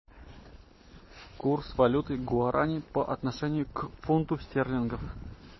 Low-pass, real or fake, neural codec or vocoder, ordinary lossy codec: 7.2 kHz; real; none; MP3, 24 kbps